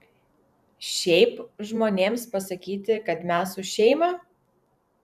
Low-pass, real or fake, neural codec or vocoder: 14.4 kHz; fake; vocoder, 44.1 kHz, 128 mel bands every 512 samples, BigVGAN v2